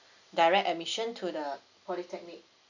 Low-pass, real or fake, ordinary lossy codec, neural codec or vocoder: 7.2 kHz; real; none; none